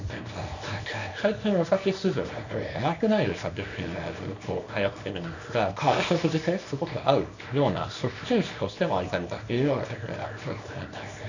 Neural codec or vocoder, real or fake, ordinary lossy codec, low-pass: codec, 24 kHz, 0.9 kbps, WavTokenizer, small release; fake; none; 7.2 kHz